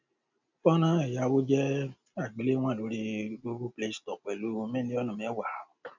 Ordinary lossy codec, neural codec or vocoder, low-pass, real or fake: none; none; 7.2 kHz; real